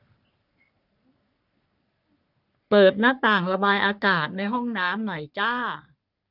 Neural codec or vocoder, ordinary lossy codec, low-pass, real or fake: codec, 44.1 kHz, 3.4 kbps, Pupu-Codec; none; 5.4 kHz; fake